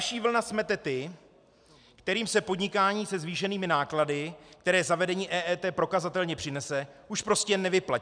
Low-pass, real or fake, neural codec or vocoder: 9.9 kHz; real; none